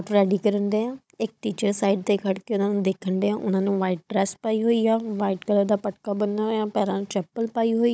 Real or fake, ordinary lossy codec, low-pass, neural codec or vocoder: fake; none; none; codec, 16 kHz, 16 kbps, FunCodec, trained on Chinese and English, 50 frames a second